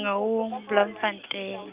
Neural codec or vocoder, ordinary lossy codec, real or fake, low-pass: none; Opus, 24 kbps; real; 3.6 kHz